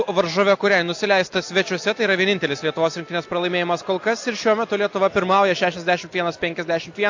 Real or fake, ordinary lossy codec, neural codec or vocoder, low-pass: real; AAC, 48 kbps; none; 7.2 kHz